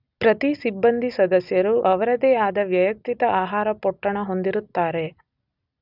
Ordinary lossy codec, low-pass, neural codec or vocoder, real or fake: none; 5.4 kHz; none; real